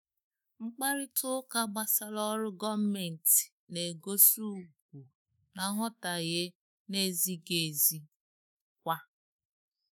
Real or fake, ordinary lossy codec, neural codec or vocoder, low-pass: fake; none; autoencoder, 48 kHz, 128 numbers a frame, DAC-VAE, trained on Japanese speech; none